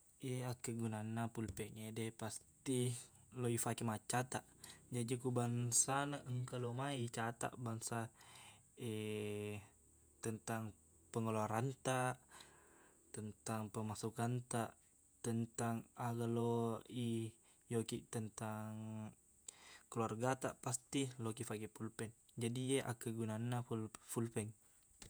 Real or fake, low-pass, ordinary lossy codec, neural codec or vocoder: fake; none; none; vocoder, 48 kHz, 128 mel bands, Vocos